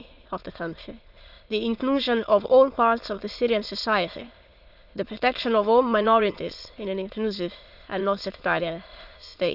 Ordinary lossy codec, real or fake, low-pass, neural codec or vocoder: Opus, 64 kbps; fake; 5.4 kHz; autoencoder, 22.05 kHz, a latent of 192 numbers a frame, VITS, trained on many speakers